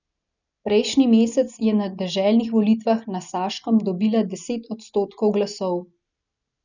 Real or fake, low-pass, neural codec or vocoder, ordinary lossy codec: real; 7.2 kHz; none; none